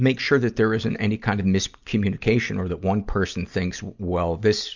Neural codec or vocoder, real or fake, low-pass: none; real; 7.2 kHz